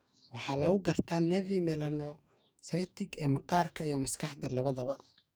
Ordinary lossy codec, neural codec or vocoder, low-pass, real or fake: none; codec, 44.1 kHz, 2.6 kbps, DAC; none; fake